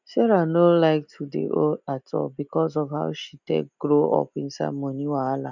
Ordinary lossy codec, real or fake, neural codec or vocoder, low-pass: none; real; none; 7.2 kHz